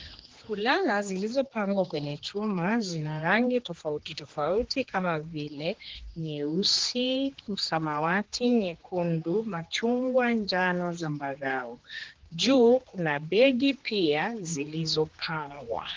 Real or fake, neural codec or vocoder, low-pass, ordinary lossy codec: fake; codec, 16 kHz, 2 kbps, X-Codec, HuBERT features, trained on general audio; 7.2 kHz; Opus, 16 kbps